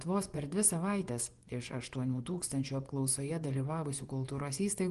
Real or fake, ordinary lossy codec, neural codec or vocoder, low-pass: fake; Opus, 24 kbps; vocoder, 24 kHz, 100 mel bands, Vocos; 10.8 kHz